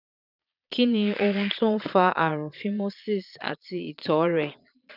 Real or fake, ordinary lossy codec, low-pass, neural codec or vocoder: fake; none; 5.4 kHz; vocoder, 22.05 kHz, 80 mel bands, Vocos